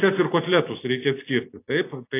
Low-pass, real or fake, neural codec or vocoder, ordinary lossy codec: 3.6 kHz; real; none; AAC, 24 kbps